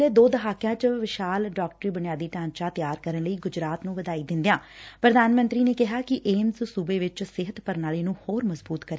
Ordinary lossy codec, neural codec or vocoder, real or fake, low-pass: none; none; real; none